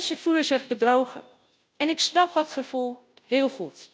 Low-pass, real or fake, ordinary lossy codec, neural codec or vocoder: none; fake; none; codec, 16 kHz, 0.5 kbps, FunCodec, trained on Chinese and English, 25 frames a second